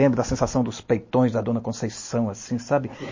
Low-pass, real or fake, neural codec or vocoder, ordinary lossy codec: 7.2 kHz; real; none; MP3, 32 kbps